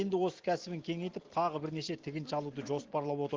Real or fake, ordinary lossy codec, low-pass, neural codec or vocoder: real; Opus, 16 kbps; 7.2 kHz; none